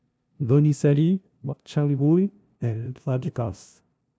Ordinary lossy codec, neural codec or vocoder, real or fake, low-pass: none; codec, 16 kHz, 0.5 kbps, FunCodec, trained on LibriTTS, 25 frames a second; fake; none